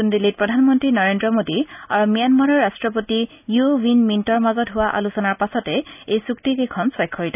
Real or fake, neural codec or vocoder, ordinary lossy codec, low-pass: real; none; none; 3.6 kHz